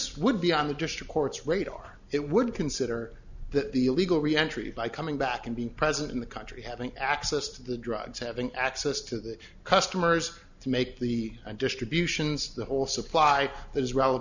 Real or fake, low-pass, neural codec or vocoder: real; 7.2 kHz; none